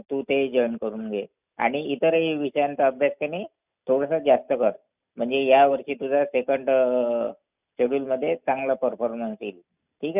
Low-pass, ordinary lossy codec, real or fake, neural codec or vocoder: 3.6 kHz; none; real; none